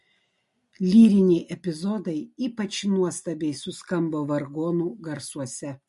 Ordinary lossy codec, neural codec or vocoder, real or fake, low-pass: MP3, 48 kbps; none; real; 14.4 kHz